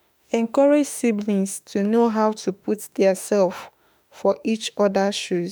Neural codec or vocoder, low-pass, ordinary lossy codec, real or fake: autoencoder, 48 kHz, 32 numbers a frame, DAC-VAE, trained on Japanese speech; none; none; fake